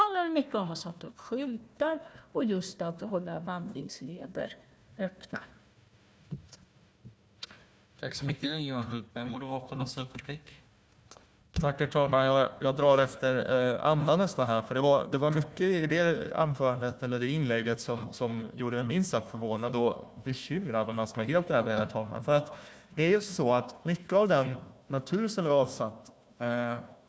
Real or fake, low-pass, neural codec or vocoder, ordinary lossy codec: fake; none; codec, 16 kHz, 1 kbps, FunCodec, trained on Chinese and English, 50 frames a second; none